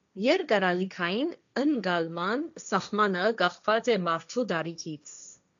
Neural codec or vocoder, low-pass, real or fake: codec, 16 kHz, 1.1 kbps, Voila-Tokenizer; 7.2 kHz; fake